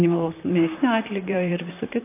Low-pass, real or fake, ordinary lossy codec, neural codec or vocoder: 3.6 kHz; fake; AAC, 32 kbps; vocoder, 44.1 kHz, 128 mel bands, Pupu-Vocoder